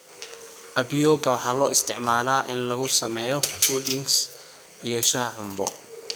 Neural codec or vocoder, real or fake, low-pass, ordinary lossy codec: codec, 44.1 kHz, 2.6 kbps, SNAC; fake; none; none